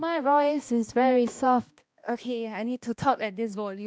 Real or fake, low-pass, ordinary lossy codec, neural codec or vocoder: fake; none; none; codec, 16 kHz, 1 kbps, X-Codec, HuBERT features, trained on balanced general audio